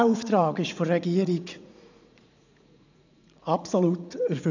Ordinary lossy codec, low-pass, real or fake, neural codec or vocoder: none; 7.2 kHz; fake; vocoder, 44.1 kHz, 80 mel bands, Vocos